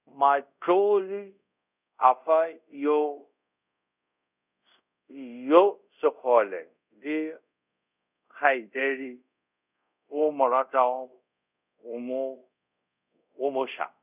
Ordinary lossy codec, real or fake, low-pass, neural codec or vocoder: none; fake; 3.6 kHz; codec, 24 kHz, 0.5 kbps, DualCodec